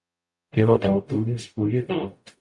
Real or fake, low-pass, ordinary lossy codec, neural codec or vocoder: fake; 10.8 kHz; MP3, 64 kbps; codec, 44.1 kHz, 0.9 kbps, DAC